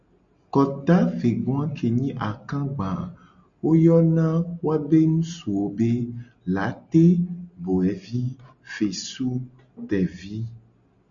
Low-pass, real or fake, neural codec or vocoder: 7.2 kHz; real; none